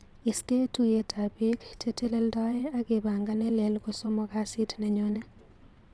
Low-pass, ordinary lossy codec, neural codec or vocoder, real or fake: none; none; vocoder, 22.05 kHz, 80 mel bands, WaveNeXt; fake